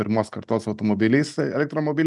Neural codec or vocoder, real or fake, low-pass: none; real; 10.8 kHz